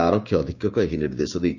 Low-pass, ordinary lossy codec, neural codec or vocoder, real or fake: 7.2 kHz; none; codec, 16 kHz, 6 kbps, DAC; fake